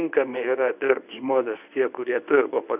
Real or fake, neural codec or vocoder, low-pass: fake; codec, 24 kHz, 0.9 kbps, WavTokenizer, medium speech release version 1; 3.6 kHz